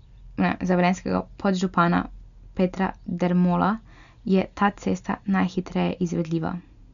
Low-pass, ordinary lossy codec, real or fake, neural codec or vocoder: 7.2 kHz; none; real; none